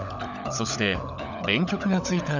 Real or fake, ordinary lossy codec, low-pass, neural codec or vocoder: fake; none; 7.2 kHz; codec, 16 kHz, 16 kbps, FunCodec, trained on LibriTTS, 50 frames a second